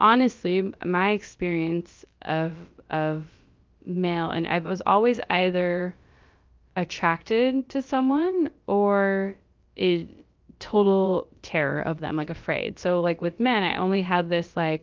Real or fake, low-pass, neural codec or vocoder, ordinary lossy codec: fake; 7.2 kHz; codec, 16 kHz, about 1 kbps, DyCAST, with the encoder's durations; Opus, 24 kbps